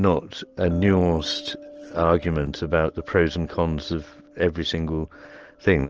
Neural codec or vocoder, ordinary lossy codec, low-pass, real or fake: none; Opus, 16 kbps; 7.2 kHz; real